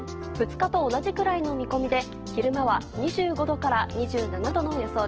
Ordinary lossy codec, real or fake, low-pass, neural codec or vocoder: Opus, 16 kbps; real; 7.2 kHz; none